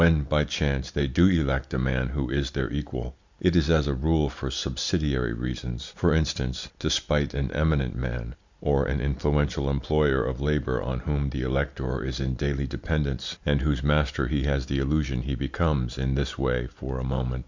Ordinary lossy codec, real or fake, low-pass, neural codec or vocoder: Opus, 64 kbps; real; 7.2 kHz; none